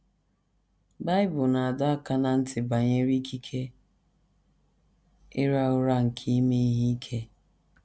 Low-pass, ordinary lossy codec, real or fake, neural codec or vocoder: none; none; real; none